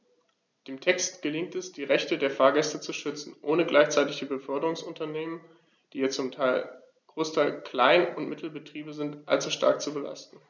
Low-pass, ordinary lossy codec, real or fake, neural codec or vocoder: 7.2 kHz; none; real; none